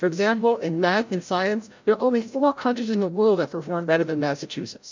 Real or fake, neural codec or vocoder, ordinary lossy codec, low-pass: fake; codec, 16 kHz, 0.5 kbps, FreqCodec, larger model; MP3, 64 kbps; 7.2 kHz